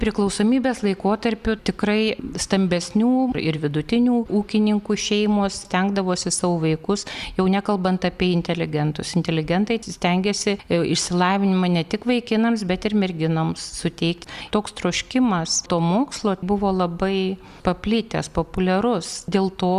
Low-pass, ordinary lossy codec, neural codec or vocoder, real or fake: 14.4 kHz; Opus, 64 kbps; none; real